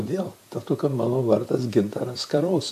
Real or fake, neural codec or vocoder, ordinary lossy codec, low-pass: fake; vocoder, 44.1 kHz, 128 mel bands, Pupu-Vocoder; AAC, 96 kbps; 14.4 kHz